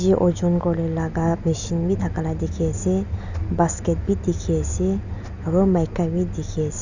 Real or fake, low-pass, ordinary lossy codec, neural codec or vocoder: real; 7.2 kHz; none; none